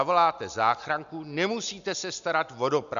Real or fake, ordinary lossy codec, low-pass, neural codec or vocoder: real; AAC, 96 kbps; 7.2 kHz; none